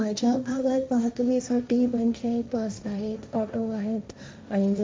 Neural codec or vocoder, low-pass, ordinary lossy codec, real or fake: codec, 16 kHz, 1.1 kbps, Voila-Tokenizer; none; none; fake